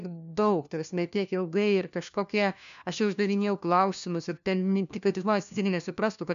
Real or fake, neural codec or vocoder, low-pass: fake; codec, 16 kHz, 1 kbps, FunCodec, trained on LibriTTS, 50 frames a second; 7.2 kHz